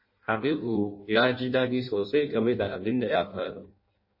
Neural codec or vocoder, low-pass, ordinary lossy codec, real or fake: codec, 16 kHz in and 24 kHz out, 0.6 kbps, FireRedTTS-2 codec; 5.4 kHz; MP3, 24 kbps; fake